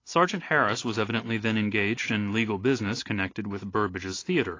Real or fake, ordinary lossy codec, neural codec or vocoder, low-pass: real; AAC, 32 kbps; none; 7.2 kHz